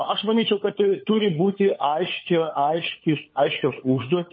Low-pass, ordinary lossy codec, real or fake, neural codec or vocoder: 7.2 kHz; MP3, 24 kbps; fake; codec, 16 kHz, 4 kbps, FunCodec, trained on LibriTTS, 50 frames a second